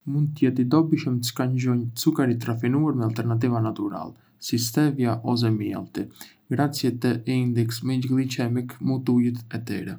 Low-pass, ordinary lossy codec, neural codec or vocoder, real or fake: none; none; none; real